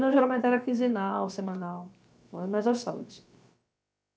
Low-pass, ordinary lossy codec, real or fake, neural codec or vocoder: none; none; fake; codec, 16 kHz, about 1 kbps, DyCAST, with the encoder's durations